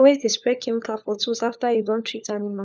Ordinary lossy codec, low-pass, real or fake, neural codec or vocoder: none; none; fake; codec, 16 kHz, 4 kbps, FunCodec, trained on LibriTTS, 50 frames a second